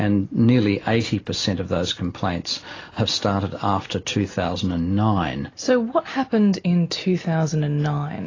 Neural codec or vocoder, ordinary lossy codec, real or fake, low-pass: none; AAC, 32 kbps; real; 7.2 kHz